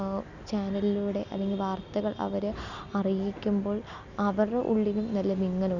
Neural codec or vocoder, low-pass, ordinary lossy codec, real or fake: none; 7.2 kHz; none; real